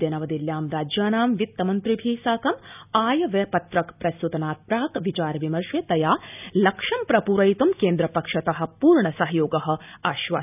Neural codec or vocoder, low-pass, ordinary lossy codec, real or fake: none; 3.6 kHz; none; real